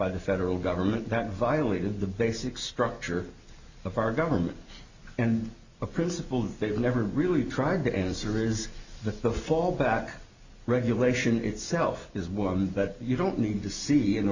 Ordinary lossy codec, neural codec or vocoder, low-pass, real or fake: Opus, 64 kbps; none; 7.2 kHz; real